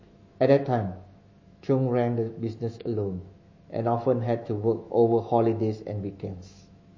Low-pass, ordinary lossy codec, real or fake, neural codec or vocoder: 7.2 kHz; MP3, 32 kbps; fake; autoencoder, 48 kHz, 128 numbers a frame, DAC-VAE, trained on Japanese speech